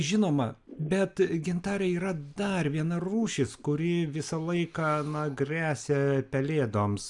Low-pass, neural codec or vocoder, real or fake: 10.8 kHz; none; real